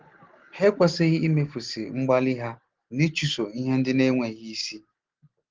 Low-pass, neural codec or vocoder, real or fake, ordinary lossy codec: 7.2 kHz; none; real; Opus, 16 kbps